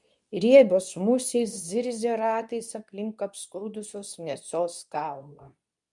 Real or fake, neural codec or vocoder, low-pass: fake; codec, 24 kHz, 0.9 kbps, WavTokenizer, medium speech release version 2; 10.8 kHz